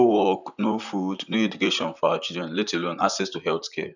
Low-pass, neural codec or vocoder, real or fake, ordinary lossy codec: 7.2 kHz; vocoder, 44.1 kHz, 128 mel bands, Pupu-Vocoder; fake; none